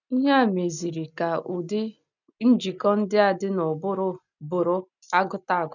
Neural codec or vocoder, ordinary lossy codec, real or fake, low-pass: none; none; real; 7.2 kHz